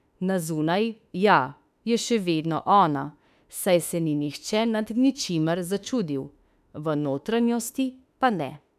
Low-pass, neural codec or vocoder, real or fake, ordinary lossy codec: 14.4 kHz; autoencoder, 48 kHz, 32 numbers a frame, DAC-VAE, trained on Japanese speech; fake; none